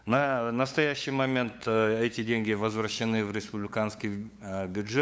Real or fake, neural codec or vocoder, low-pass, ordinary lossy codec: fake; codec, 16 kHz, 8 kbps, FunCodec, trained on LibriTTS, 25 frames a second; none; none